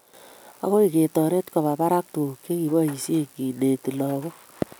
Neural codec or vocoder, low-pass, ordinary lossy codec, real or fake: none; none; none; real